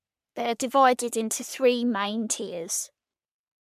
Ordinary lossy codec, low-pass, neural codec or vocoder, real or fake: MP3, 96 kbps; 14.4 kHz; codec, 44.1 kHz, 3.4 kbps, Pupu-Codec; fake